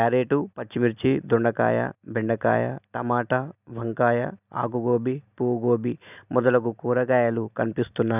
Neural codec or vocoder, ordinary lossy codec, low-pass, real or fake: none; none; 3.6 kHz; real